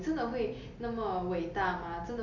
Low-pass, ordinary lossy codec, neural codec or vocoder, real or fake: 7.2 kHz; none; none; real